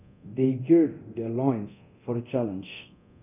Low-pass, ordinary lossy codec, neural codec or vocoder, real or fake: 3.6 kHz; none; codec, 24 kHz, 0.9 kbps, DualCodec; fake